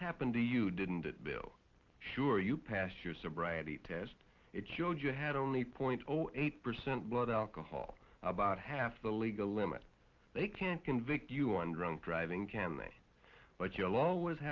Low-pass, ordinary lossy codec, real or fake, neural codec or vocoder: 7.2 kHz; Opus, 24 kbps; real; none